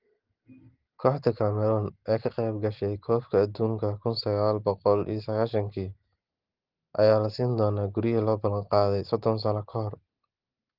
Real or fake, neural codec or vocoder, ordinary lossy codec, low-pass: real; none; Opus, 16 kbps; 5.4 kHz